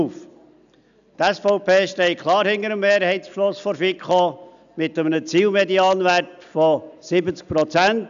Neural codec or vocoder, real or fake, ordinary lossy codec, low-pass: none; real; none; 7.2 kHz